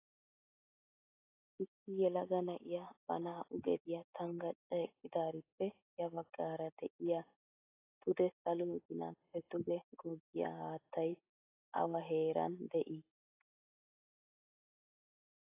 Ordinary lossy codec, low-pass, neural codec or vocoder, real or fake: AAC, 24 kbps; 3.6 kHz; none; real